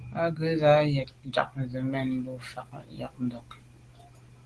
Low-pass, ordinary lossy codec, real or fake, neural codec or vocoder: 10.8 kHz; Opus, 16 kbps; real; none